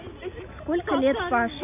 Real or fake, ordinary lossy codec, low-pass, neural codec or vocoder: real; none; 3.6 kHz; none